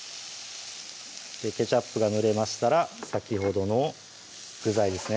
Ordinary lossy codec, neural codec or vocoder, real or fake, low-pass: none; none; real; none